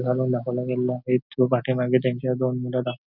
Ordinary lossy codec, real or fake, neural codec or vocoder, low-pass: none; real; none; 5.4 kHz